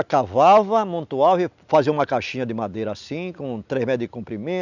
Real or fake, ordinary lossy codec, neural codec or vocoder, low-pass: real; none; none; 7.2 kHz